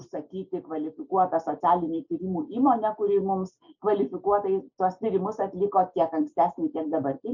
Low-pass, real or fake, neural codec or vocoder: 7.2 kHz; real; none